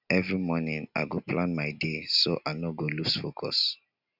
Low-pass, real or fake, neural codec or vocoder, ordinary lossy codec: 5.4 kHz; real; none; none